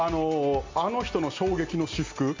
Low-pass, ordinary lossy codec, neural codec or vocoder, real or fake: 7.2 kHz; MP3, 48 kbps; none; real